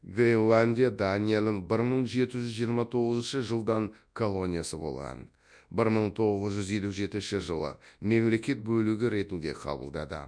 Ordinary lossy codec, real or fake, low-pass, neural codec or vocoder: none; fake; 9.9 kHz; codec, 24 kHz, 0.9 kbps, WavTokenizer, large speech release